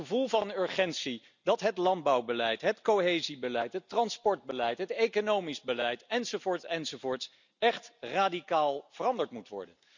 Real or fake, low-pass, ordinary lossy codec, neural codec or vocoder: real; 7.2 kHz; none; none